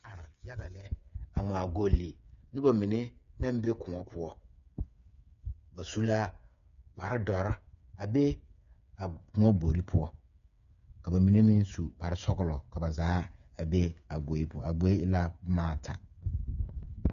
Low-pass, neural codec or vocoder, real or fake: 7.2 kHz; codec, 16 kHz, 8 kbps, FreqCodec, smaller model; fake